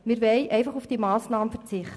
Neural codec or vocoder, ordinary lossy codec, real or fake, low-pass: none; none; real; none